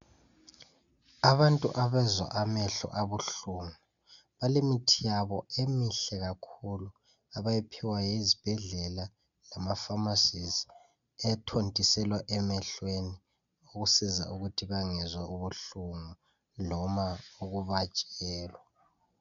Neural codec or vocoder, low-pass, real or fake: none; 7.2 kHz; real